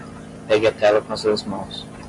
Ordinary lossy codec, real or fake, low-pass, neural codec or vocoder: MP3, 64 kbps; real; 10.8 kHz; none